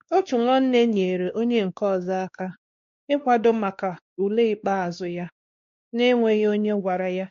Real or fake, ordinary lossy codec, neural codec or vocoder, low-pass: fake; MP3, 48 kbps; codec, 16 kHz, 2 kbps, X-Codec, WavLM features, trained on Multilingual LibriSpeech; 7.2 kHz